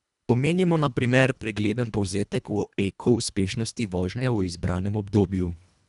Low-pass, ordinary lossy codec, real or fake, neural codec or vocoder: 10.8 kHz; none; fake; codec, 24 kHz, 1.5 kbps, HILCodec